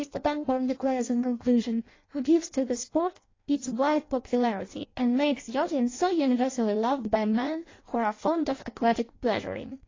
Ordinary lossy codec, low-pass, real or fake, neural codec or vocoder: AAC, 32 kbps; 7.2 kHz; fake; codec, 16 kHz in and 24 kHz out, 0.6 kbps, FireRedTTS-2 codec